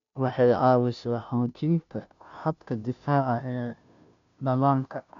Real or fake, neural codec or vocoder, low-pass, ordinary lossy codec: fake; codec, 16 kHz, 0.5 kbps, FunCodec, trained on Chinese and English, 25 frames a second; 7.2 kHz; MP3, 64 kbps